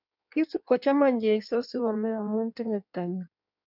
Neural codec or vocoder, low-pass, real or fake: codec, 16 kHz in and 24 kHz out, 1.1 kbps, FireRedTTS-2 codec; 5.4 kHz; fake